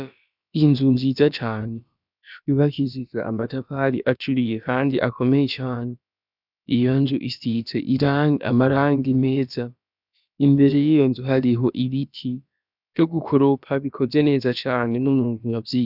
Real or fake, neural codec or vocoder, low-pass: fake; codec, 16 kHz, about 1 kbps, DyCAST, with the encoder's durations; 5.4 kHz